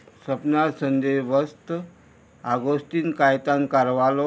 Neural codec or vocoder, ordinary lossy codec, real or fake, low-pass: none; none; real; none